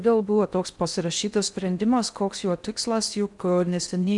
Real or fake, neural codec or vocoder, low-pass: fake; codec, 16 kHz in and 24 kHz out, 0.6 kbps, FocalCodec, streaming, 4096 codes; 10.8 kHz